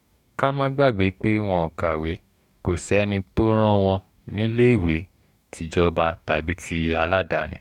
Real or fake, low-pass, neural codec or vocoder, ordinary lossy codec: fake; 19.8 kHz; codec, 44.1 kHz, 2.6 kbps, DAC; none